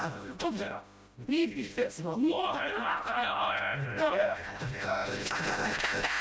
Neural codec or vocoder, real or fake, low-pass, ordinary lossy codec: codec, 16 kHz, 0.5 kbps, FreqCodec, smaller model; fake; none; none